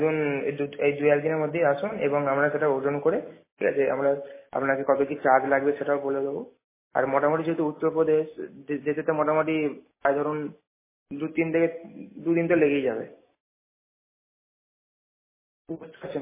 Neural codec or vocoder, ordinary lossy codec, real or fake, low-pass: none; MP3, 16 kbps; real; 3.6 kHz